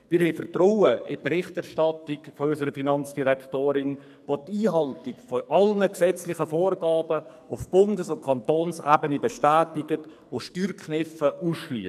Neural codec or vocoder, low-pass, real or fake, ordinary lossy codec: codec, 44.1 kHz, 2.6 kbps, SNAC; 14.4 kHz; fake; none